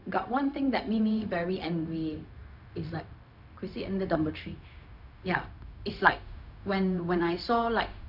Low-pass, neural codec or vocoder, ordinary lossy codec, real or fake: 5.4 kHz; codec, 16 kHz, 0.4 kbps, LongCat-Audio-Codec; none; fake